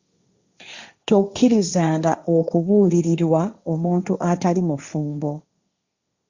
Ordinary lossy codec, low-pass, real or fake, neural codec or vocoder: Opus, 64 kbps; 7.2 kHz; fake; codec, 16 kHz, 1.1 kbps, Voila-Tokenizer